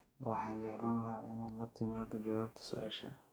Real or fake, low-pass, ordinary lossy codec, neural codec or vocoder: fake; none; none; codec, 44.1 kHz, 2.6 kbps, DAC